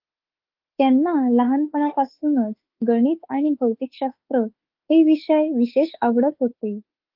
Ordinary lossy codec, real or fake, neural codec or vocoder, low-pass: Opus, 32 kbps; fake; autoencoder, 48 kHz, 32 numbers a frame, DAC-VAE, trained on Japanese speech; 5.4 kHz